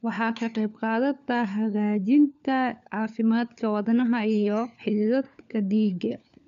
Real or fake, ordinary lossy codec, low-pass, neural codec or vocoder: fake; none; 7.2 kHz; codec, 16 kHz, 4 kbps, FunCodec, trained on LibriTTS, 50 frames a second